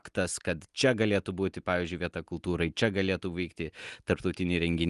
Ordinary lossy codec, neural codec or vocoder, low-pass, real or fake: Opus, 32 kbps; none; 10.8 kHz; real